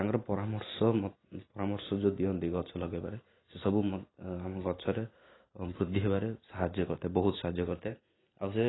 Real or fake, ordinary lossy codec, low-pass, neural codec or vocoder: real; AAC, 16 kbps; 7.2 kHz; none